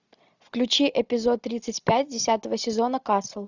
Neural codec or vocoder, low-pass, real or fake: none; 7.2 kHz; real